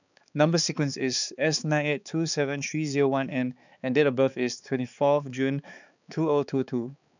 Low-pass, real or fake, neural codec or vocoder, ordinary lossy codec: 7.2 kHz; fake; codec, 16 kHz, 4 kbps, X-Codec, HuBERT features, trained on balanced general audio; none